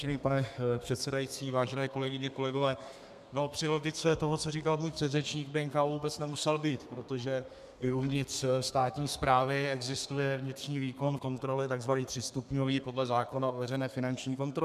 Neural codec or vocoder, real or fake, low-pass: codec, 32 kHz, 1.9 kbps, SNAC; fake; 14.4 kHz